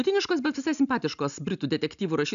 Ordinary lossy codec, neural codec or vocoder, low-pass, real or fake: AAC, 96 kbps; none; 7.2 kHz; real